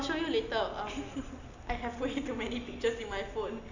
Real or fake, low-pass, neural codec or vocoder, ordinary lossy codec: real; 7.2 kHz; none; none